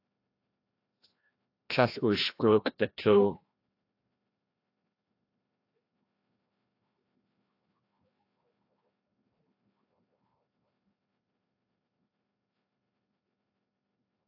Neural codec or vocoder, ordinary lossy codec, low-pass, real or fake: codec, 16 kHz, 1 kbps, FreqCodec, larger model; AAC, 32 kbps; 5.4 kHz; fake